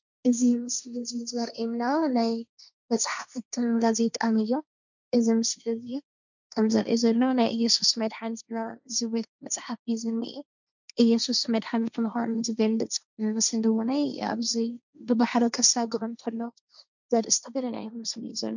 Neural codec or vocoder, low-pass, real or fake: codec, 16 kHz, 1.1 kbps, Voila-Tokenizer; 7.2 kHz; fake